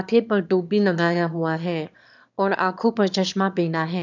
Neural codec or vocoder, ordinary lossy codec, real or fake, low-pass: autoencoder, 22.05 kHz, a latent of 192 numbers a frame, VITS, trained on one speaker; none; fake; 7.2 kHz